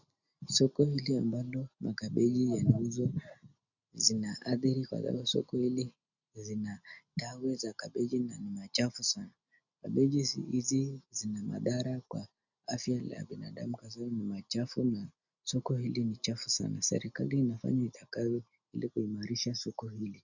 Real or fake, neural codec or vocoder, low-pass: real; none; 7.2 kHz